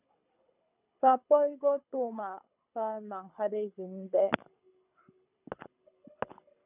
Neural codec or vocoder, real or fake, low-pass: codec, 24 kHz, 6 kbps, HILCodec; fake; 3.6 kHz